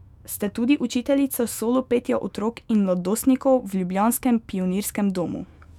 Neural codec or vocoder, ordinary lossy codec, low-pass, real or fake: autoencoder, 48 kHz, 128 numbers a frame, DAC-VAE, trained on Japanese speech; none; 19.8 kHz; fake